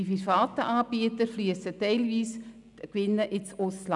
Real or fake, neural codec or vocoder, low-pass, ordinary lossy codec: real; none; 10.8 kHz; none